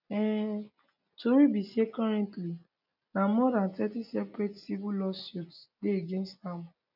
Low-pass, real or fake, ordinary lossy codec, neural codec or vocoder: 5.4 kHz; real; none; none